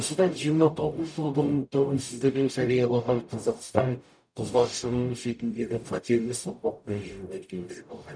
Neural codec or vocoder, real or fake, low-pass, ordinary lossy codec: codec, 44.1 kHz, 0.9 kbps, DAC; fake; 9.9 kHz; none